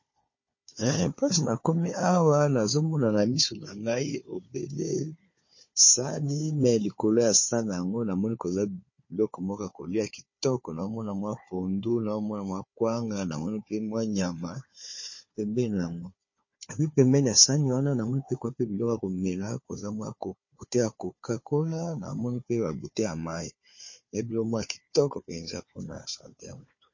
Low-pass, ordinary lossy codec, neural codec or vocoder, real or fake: 7.2 kHz; MP3, 32 kbps; codec, 16 kHz, 4 kbps, FunCodec, trained on Chinese and English, 50 frames a second; fake